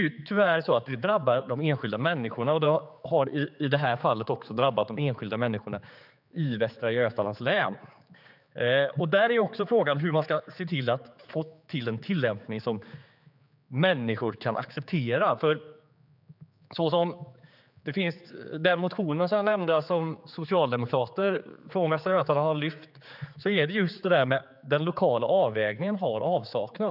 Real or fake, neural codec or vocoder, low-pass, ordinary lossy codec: fake; codec, 16 kHz, 4 kbps, X-Codec, HuBERT features, trained on general audio; 5.4 kHz; none